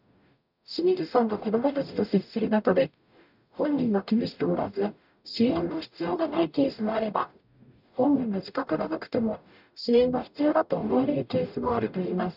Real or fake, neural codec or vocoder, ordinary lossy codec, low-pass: fake; codec, 44.1 kHz, 0.9 kbps, DAC; none; 5.4 kHz